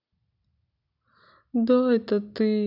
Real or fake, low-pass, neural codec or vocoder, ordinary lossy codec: real; 5.4 kHz; none; none